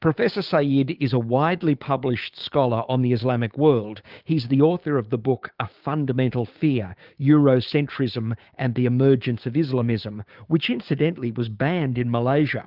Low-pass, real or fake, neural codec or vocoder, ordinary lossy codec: 5.4 kHz; fake; codec, 16 kHz, 6 kbps, DAC; Opus, 24 kbps